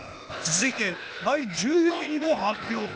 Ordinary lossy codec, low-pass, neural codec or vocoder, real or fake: none; none; codec, 16 kHz, 0.8 kbps, ZipCodec; fake